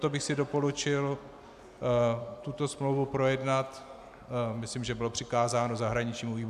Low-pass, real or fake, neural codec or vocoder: 14.4 kHz; real; none